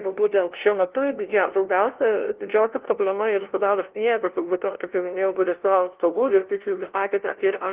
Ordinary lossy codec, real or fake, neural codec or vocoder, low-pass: Opus, 16 kbps; fake; codec, 16 kHz, 0.5 kbps, FunCodec, trained on LibriTTS, 25 frames a second; 3.6 kHz